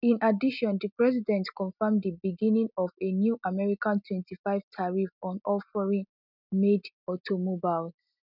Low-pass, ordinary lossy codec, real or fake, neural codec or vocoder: 5.4 kHz; none; real; none